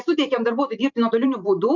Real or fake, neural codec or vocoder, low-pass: fake; vocoder, 44.1 kHz, 128 mel bands every 512 samples, BigVGAN v2; 7.2 kHz